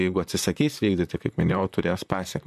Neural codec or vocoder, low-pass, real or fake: vocoder, 44.1 kHz, 128 mel bands, Pupu-Vocoder; 14.4 kHz; fake